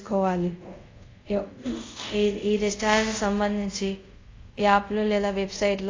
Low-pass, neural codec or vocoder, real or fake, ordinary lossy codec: 7.2 kHz; codec, 24 kHz, 0.5 kbps, DualCodec; fake; AAC, 32 kbps